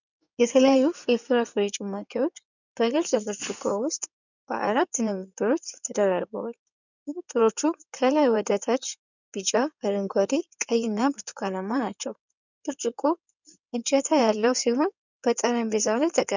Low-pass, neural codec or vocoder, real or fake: 7.2 kHz; codec, 16 kHz in and 24 kHz out, 2.2 kbps, FireRedTTS-2 codec; fake